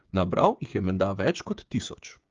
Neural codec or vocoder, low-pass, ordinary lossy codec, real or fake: codec, 16 kHz, 8 kbps, FreqCodec, smaller model; 7.2 kHz; Opus, 32 kbps; fake